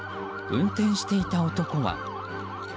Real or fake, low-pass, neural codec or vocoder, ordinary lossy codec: real; none; none; none